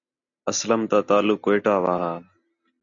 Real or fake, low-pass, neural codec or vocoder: real; 7.2 kHz; none